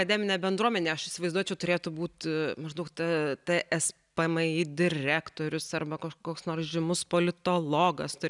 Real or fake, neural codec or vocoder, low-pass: real; none; 10.8 kHz